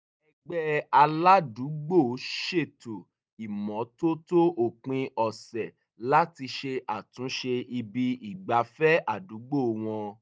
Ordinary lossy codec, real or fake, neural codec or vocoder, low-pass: none; real; none; none